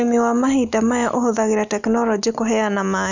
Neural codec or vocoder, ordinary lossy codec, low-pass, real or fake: none; none; 7.2 kHz; real